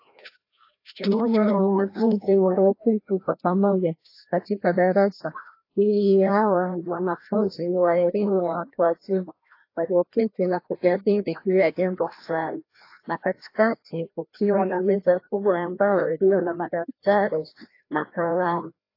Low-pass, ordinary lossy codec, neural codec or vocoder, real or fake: 5.4 kHz; AAC, 32 kbps; codec, 16 kHz, 1 kbps, FreqCodec, larger model; fake